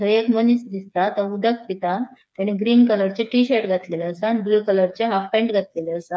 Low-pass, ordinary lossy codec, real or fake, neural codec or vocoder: none; none; fake; codec, 16 kHz, 4 kbps, FreqCodec, smaller model